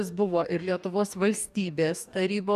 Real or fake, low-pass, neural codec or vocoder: fake; 14.4 kHz; codec, 44.1 kHz, 2.6 kbps, DAC